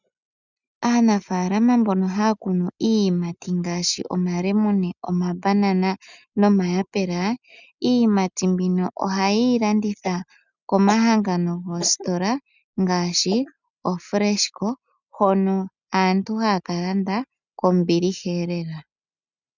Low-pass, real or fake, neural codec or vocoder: 7.2 kHz; real; none